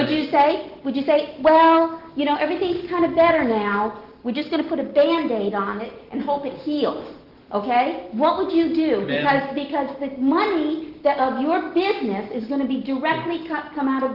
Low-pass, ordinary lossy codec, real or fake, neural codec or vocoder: 5.4 kHz; Opus, 16 kbps; real; none